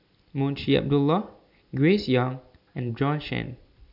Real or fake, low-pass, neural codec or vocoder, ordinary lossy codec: real; 5.4 kHz; none; none